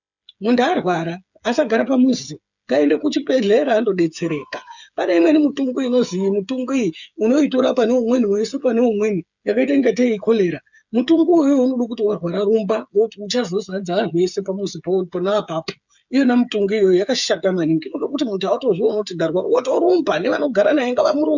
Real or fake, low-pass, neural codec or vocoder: fake; 7.2 kHz; codec, 16 kHz, 8 kbps, FreqCodec, smaller model